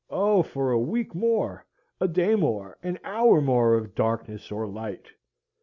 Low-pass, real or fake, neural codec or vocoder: 7.2 kHz; real; none